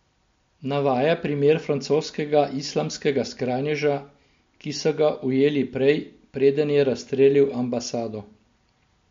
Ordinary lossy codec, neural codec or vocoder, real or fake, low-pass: MP3, 48 kbps; none; real; 7.2 kHz